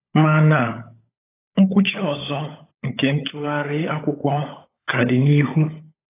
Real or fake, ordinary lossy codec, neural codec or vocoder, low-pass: fake; AAC, 16 kbps; codec, 16 kHz, 16 kbps, FunCodec, trained on LibriTTS, 50 frames a second; 3.6 kHz